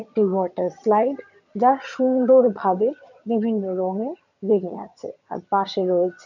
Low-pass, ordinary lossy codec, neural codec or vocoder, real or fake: 7.2 kHz; none; vocoder, 22.05 kHz, 80 mel bands, HiFi-GAN; fake